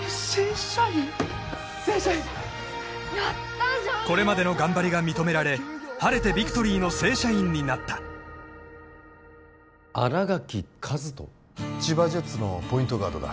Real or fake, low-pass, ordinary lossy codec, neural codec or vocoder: real; none; none; none